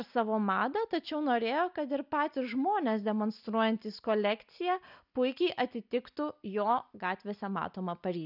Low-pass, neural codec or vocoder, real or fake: 5.4 kHz; none; real